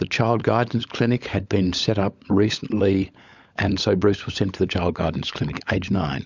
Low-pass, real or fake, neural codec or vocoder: 7.2 kHz; fake; codec, 16 kHz, 16 kbps, FunCodec, trained on LibriTTS, 50 frames a second